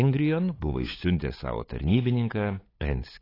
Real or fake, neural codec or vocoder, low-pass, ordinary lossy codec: fake; codec, 16 kHz, 8 kbps, FunCodec, trained on LibriTTS, 25 frames a second; 5.4 kHz; AAC, 24 kbps